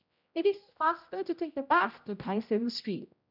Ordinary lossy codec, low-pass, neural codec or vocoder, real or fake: none; 5.4 kHz; codec, 16 kHz, 0.5 kbps, X-Codec, HuBERT features, trained on general audio; fake